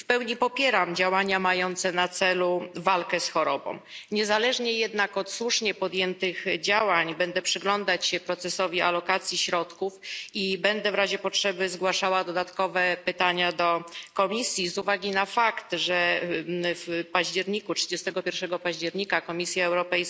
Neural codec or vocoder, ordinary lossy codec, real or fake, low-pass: none; none; real; none